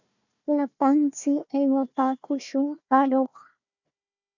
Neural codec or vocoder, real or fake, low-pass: codec, 16 kHz, 1 kbps, FunCodec, trained on Chinese and English, 50 frames a second; fake; 7.2 kHz